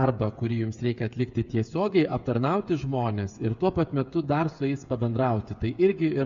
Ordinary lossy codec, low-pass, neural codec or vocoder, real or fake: Opus, 64 kbps; 7.2 kHz; codec, 16 kHz, 8 kbps, FreqCodec, smaller model; fake